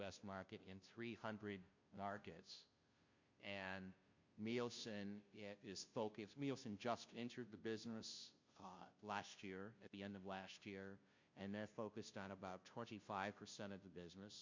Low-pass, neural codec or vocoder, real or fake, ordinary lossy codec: 7.2 kHz; codec, 16 kHz, 0.5 kbps, FunCodec, trained on Chinese and English, 25 frames a second; fake; MP3, 48 kbps